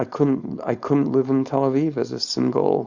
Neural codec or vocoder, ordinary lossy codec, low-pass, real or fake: codec, 16 kHz, 4.8 kbps, FACodec; Opus, 64 kbps; 7.2 kHz; fake